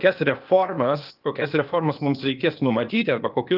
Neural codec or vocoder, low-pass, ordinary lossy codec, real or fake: codec, 16 kHz, 0.8 kbps, ZipCodec; 5.4 kHz; Opus, 24 kbps; fake